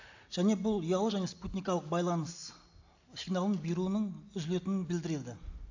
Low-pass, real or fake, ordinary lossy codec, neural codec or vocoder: 7.2 kHz; real; AAC, 48 kbps; none